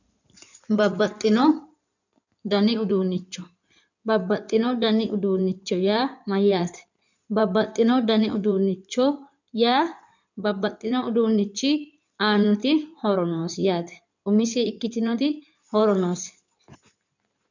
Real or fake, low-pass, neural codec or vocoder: fake; 7.2 kHz; codec, 16 kHz in and 24 kHz out, 2.2 kbps, FireRedTTS-2 codec